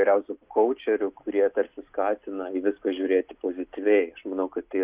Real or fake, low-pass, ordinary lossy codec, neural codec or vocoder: real; 3.6 kHz; Opus, 64 kbps; none